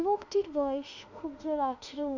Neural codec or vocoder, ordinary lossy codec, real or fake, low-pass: autoencoder, 48 kHz, 32 numbers a frame, DAC-VAE, trained on Japanese speech; none; fake; 7.2 kHz